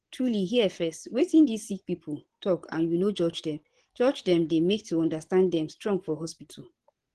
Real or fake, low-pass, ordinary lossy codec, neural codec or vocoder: fake; 10.8 kHz; Opus, 16 kbps; vocoder, 24 kHz, 100 mel bands, Vocos